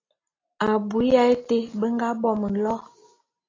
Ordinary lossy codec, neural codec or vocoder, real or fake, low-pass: AAC, 32 kbps; none; real; 7.2 kHz